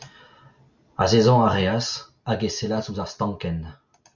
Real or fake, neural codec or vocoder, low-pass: real; none; 7.2 kHz